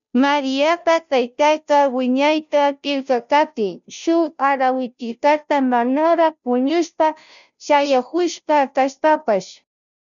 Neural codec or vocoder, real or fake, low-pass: codec, 16 kHz, 0.5 kbps, FunCodec, trained on Chinese and English, 25 frames a second; fake; 7.2 kHz